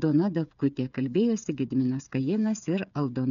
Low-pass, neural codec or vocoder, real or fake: 7.2 kHz; codec, 16 kHz, 8 kbps, FreqCodec, smaller model; fake